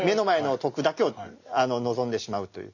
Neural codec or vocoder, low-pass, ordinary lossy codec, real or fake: none; 7.2 kHz; none; real